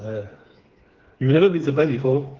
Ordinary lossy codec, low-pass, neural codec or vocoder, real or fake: Opus, 16 kbps; 7.2 kHz; codec, 16 kHz, 4 kbps, FreqCodec, smaller model; fake